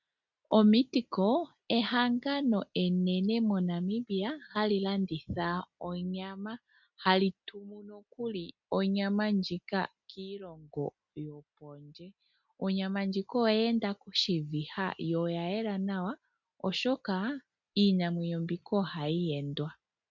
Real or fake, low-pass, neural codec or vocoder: real; 7.2 kHz; none